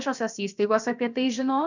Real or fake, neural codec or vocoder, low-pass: fake; codec, 16 kHz, about 1 kbps, DyCAST, with the encoder's durations; 7.2 kHz